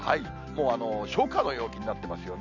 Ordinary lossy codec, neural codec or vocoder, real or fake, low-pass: none; none; real; 7.2 kHz